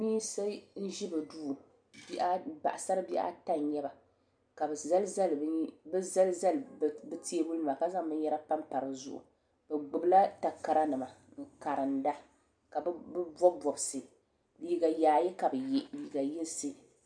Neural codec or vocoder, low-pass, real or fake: none; 9.9 kHz; real